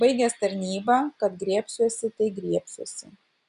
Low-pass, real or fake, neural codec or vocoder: 10.8 kHz; real; none